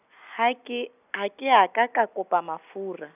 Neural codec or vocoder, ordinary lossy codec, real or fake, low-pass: none; none; real; 3.6 kHz